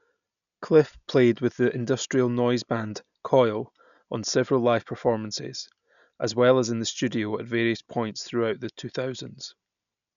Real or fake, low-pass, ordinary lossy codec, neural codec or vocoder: real; 7.2 kHz; none; none